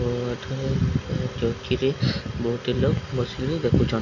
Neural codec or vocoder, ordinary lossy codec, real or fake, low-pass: none; none; real; 7.2 kHz